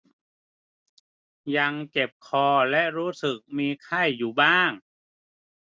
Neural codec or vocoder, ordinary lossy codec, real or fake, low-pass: none; none; real; none